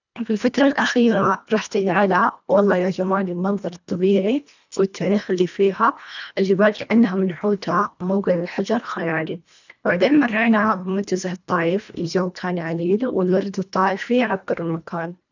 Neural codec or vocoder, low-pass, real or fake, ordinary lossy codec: codec, 24 kHz, 1.5 kbps, HILCodec; 7.2 kHz; fake; none